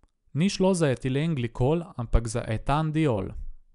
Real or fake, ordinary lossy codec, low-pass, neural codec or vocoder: real; none; 10.8 kHz; none